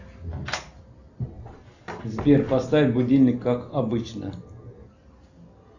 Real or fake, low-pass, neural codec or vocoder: real; 7.2 kHz; none